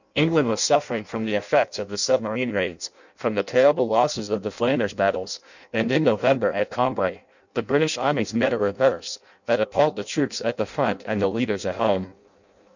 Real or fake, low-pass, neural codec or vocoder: fake; 7.2 kHz; codec, 16 kHz in and 24 kHz out, 0.6 kbps, FireRedTTS-2 codec